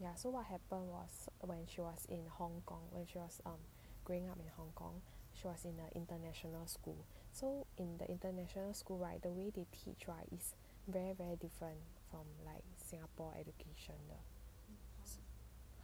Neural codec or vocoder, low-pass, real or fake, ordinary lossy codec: none; none; real; none